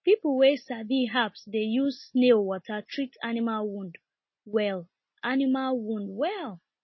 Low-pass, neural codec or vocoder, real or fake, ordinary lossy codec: 7.2 kHz; none; real; MP3, 24 kbps